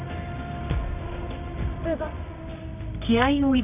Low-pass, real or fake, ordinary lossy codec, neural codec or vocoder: 3.6 kHz; fake; none; codec, 24 kHz, 0.9 kbps, WavTokenizer, medium music audio release